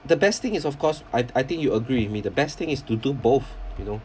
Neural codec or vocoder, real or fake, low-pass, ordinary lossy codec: none; real; none; none